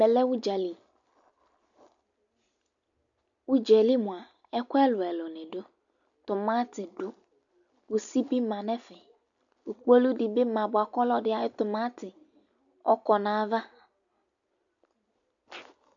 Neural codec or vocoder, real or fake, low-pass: none; real; 7.2 kHz